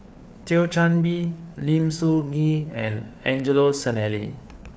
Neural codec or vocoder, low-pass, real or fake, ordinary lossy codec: codec, 16 kHz, 4 kbps, FreqCodec, larger model; none; fake; none